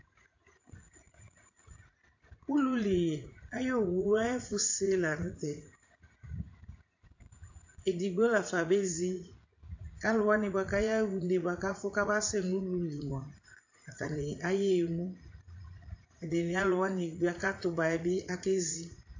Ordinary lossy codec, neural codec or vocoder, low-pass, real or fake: AAC, 48 kbps; vocoder, 44.1 kHz, 80 mel bands, Vocos; 7.2 kHz; fake